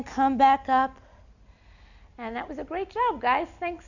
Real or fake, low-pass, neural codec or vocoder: real; 7.2 kHz; none